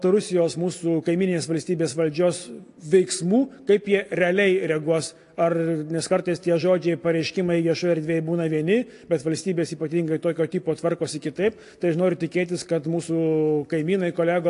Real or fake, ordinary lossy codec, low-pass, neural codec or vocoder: real; AAC, 48 kbps; 10.8 kHz; none